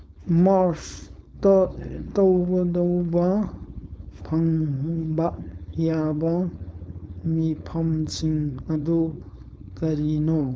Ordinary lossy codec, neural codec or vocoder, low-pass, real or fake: none; codec, 16 kHz, 4.8 kbps, FACodec; none; fake